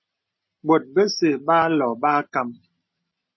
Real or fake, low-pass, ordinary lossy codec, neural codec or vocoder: real; 7.2 kHz; MP3, 24 kbps; none